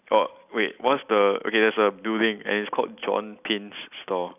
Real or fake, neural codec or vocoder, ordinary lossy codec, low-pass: real; none; none; 3.6 kHz